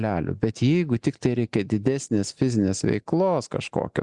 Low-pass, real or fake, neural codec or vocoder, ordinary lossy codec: 10.8 kHz; real; none; Opus, 64 kbps